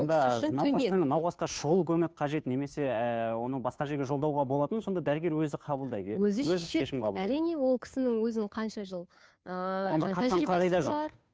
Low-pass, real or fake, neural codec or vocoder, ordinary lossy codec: none; fake; codec, 16 kHz, 2 kbps, FunCodec, trained on Chinese and English, 25 frames a second; none